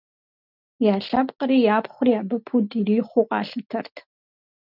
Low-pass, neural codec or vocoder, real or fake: 5.4 kHz; none; real